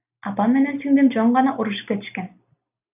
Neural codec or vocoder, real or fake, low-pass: none; real; 3.6 kHz